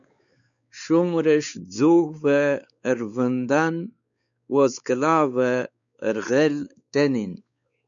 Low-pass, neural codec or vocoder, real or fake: 7.2 kHz; codec, 16 kHz, 4 kbps, X-Codec, WavLM features, trained on Multilingual LibriSpeech; fake